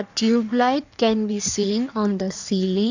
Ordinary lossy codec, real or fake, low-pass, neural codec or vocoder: none; fake; 7.2 kHz; codec, 16 kHz in and 24 kHz out, 1.1 kbps, FireRedTTS-2 codec